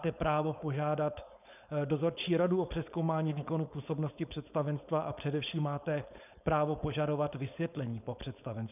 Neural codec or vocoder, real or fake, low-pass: codec, 16 kHz, 4.8 kbps, FACodec; fake; 3.6 kHz